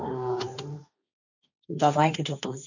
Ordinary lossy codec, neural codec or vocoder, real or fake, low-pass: none; codec, 16 kHz, 1.1 kbps, Voila-Tokenizer; fake; 7.2 kHz